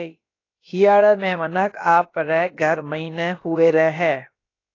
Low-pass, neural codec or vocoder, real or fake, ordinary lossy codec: 7.2 kHz; codec, 16 kHz, about 1 kbps, DyCAST, with the encoder's durations; fake; AAC, 32 kbps